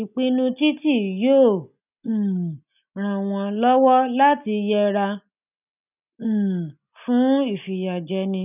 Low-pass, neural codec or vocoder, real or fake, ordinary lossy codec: 3.6 kHz; none; real; none